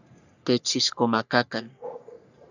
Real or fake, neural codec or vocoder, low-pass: fake; codec, 44.1 kHz, 3.4 kbps, Pupu-Codec; 7.2 kHz